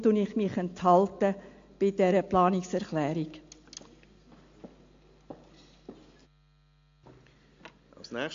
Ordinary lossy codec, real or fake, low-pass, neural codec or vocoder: AAC, 48 kbps; real; 7.2 kHz; none